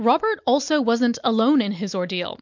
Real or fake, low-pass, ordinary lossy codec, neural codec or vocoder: real; 7.2 kHz; MP3, 64 kbps; none